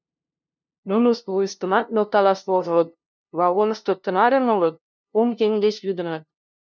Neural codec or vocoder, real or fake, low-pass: codec, 16 kHz, 0.5 kbps, FunCodec, trained on LibriTTS, 25 frames a second; fake; 7.2 kHz